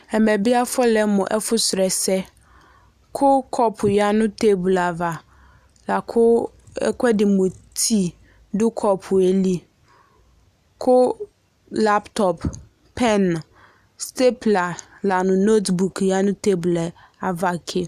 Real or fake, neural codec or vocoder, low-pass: real; none; 14.4 kHz